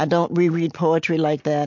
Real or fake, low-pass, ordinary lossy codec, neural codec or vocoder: real; 7.2 kHz; MP3, 48 kbps; none